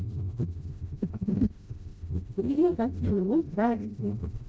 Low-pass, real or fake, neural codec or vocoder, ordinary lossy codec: none; fake; codec, 16 kHz, 0.5 kbps, FreqCodec, smaller model; none